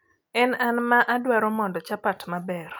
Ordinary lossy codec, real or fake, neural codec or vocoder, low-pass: none; real; none; none